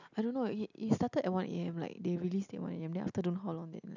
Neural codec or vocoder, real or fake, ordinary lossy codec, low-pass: none; real; none; 7.2 kHz